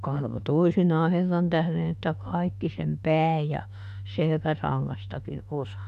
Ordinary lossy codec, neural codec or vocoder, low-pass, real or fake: none; autoencoder, 48 kHz, 32 numbers a frame, DAC-VAE, trained on Japanese speech; 14.4 kHz; fake